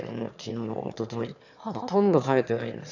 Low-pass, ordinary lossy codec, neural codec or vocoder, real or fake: 7.2 kHz; none; autoencoder, 22.05 kHz, a latent of 192 numbers a frame, VITS, trained on one speaker; fake